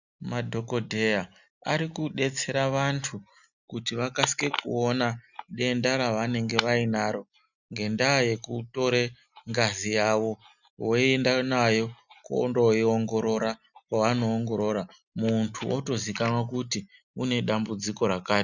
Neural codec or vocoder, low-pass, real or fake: none; 7.2 kHz; real